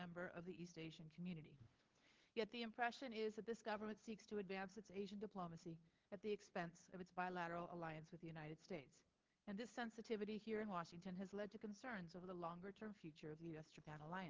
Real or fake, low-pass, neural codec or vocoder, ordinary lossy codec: fake; 7.2 kHz; codec, 16 kHz, 8 kbps, FunCodec, trained on LibriTTS, 25 frames a second; Opus, 16 kbps